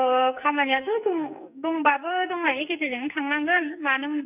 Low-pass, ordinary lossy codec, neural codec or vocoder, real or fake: 3.6 kHz; none; codec, 44.1 kHz, 2.6 kbps, SNAC; fake